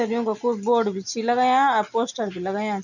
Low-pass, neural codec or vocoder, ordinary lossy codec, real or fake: 7.2 kHz; none; none; real